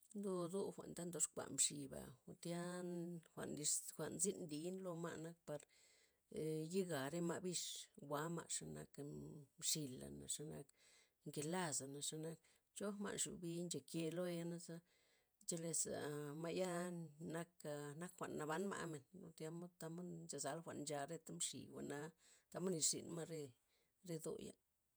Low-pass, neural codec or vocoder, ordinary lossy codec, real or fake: none; vocoder, 48 kHz, 128 mel bands, Vocos; none; fake